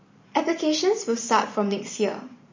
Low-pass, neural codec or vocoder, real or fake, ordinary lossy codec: 7.2 kHz; vocoder, 44.1 kHz, 128 mel bands every 512 samples, BigVGAN v2; fake; MP3, 32 kbps